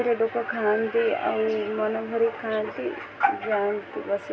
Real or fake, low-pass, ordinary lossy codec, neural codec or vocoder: real; none; none; none